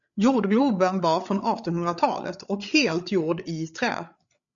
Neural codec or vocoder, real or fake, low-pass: codec, 16 kHz, 8 kbps, FreqCodec, larger model; fake; 7.2 kHz